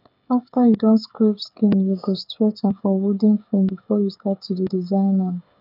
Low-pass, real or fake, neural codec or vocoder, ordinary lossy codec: 5.4 kHz; fake; codec, 16 kHz, 8 kbps, FreqCodec, smaller model; none